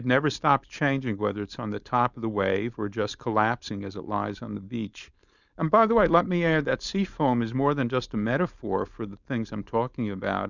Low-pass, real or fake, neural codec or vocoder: 7.2 kHz; fake; codec, 16 kHz, 4.8 kbps, FACodec